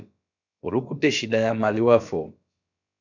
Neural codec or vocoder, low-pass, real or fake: codec, 16 kHz, about 1 kbps, DyCAST, with the encoder's durations; 7.2 kHz; fake